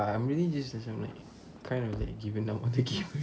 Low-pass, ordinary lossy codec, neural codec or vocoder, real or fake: none; none; none; real